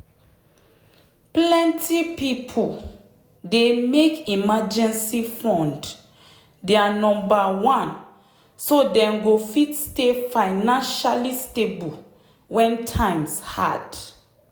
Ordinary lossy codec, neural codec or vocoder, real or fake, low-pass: none; none; real; none